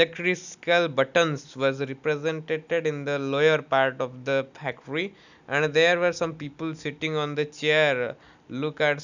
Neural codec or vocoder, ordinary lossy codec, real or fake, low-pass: none; none; real; 7.2 kHz